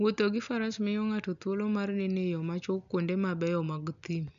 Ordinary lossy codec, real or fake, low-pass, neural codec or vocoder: MP3, 96 kbps; real; 7.2 kHz; none